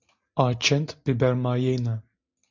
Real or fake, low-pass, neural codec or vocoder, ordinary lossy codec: real; 7.2 kHz; none; MP3, 64 kbps